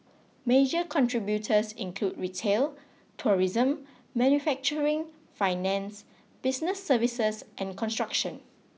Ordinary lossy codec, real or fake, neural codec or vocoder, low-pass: none; real; none; none